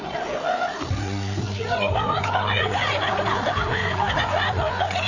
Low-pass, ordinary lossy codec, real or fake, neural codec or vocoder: 7.2 kHz; none; fake; codec, 16 kHz, 4 kbps, FreqCodec, larger model